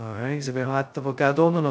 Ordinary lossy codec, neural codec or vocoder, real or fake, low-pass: none; codec, 16 kHz, 0.2 kbps, FocalCodec; fake; none